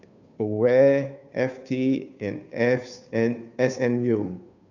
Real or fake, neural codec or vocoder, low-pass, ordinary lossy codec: fake; codec, 16 kHz, 2 kbps, FunCodec, trained on Chinese and English, 25 frames a second; 7.2 kHz; none